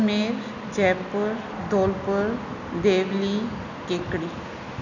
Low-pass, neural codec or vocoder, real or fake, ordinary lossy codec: 7.2 kHz; none; real; none